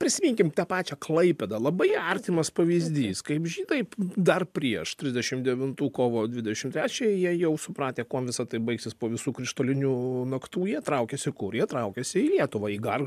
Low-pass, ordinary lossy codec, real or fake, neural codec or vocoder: 14.4 kHz; MP3, 96 kbps; real; none